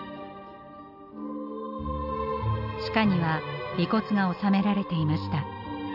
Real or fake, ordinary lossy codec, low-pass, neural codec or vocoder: real; none; 5.4 kHz; none